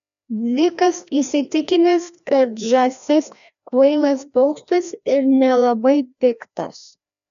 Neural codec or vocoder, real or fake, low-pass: codec, 16 kHz, 1 kbps, FreqCodec, larger model; fake; 7.2 kHz